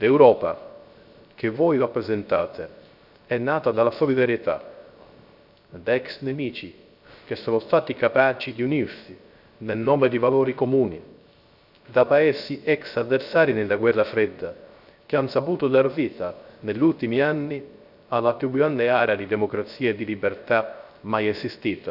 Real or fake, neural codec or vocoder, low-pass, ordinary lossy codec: fake; codec, 16 kHz, 0.3 kbps, FocalCodec; 5.4 kHz; none